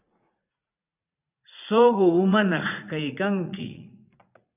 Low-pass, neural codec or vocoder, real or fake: 3.6 kHz; vocoder, 44.1 kHz, 80 mel bands, Vocos; fake